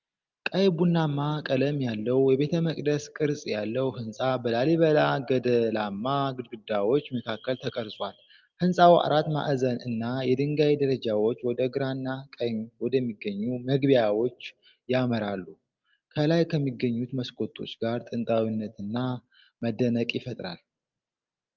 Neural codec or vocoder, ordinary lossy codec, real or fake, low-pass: none; Opus, 24 kbps; real; 7.2 kHz